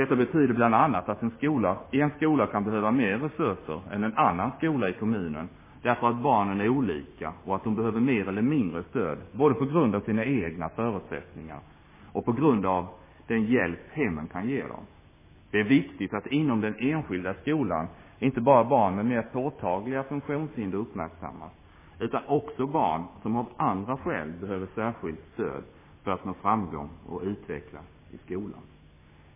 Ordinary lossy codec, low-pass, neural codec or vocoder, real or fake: MP3, 16 kbps; 3.6 kHz; codec, 16 kHz, 6 kbps, DAC; fake